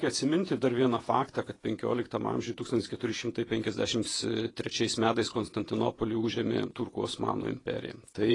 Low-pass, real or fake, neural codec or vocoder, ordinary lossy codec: 10.8 kHz; fake; vocoder, 48 kHz, 128 mel bands, Vocos; AAC, 32 kbps